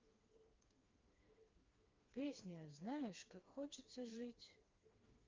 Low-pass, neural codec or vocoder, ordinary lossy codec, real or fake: 7.2 kHz; codec, 16 kHz, 2 kbps, FreqCodec, smaller model; Opus, 32 kbps; fake